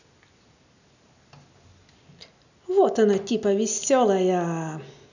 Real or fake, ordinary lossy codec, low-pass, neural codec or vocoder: real; none; 7.2 kHz; none